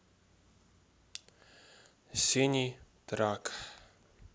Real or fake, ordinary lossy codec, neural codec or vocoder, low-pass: real; none; none; none